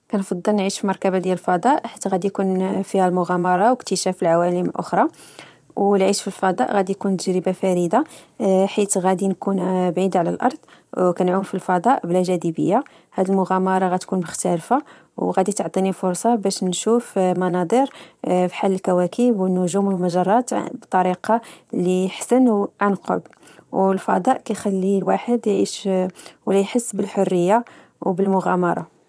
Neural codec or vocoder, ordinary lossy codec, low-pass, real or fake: vocoder, 22.05 kHz, 80 mel bands, Vocos; none; none; fake